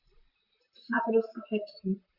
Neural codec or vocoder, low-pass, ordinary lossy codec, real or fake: vocoder, 44.1 kHz, 128 mel bands, Pupu-Vocoder; 5.4 kHz; Opus, 64 kbps; fake